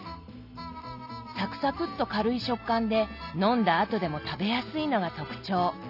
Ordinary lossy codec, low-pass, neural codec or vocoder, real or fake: none; 5.4 kHz; none; real